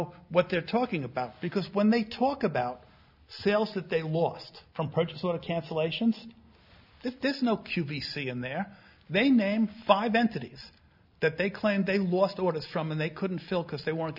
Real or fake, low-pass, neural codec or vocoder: real; 5.4 kHz; none